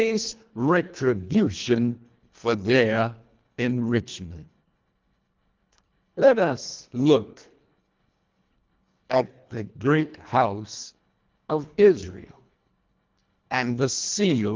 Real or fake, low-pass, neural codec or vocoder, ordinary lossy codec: fake; 7.2 kHz; codec, 24 kHz, 1.5 kbps, HILCodec; Opus, 32 kbps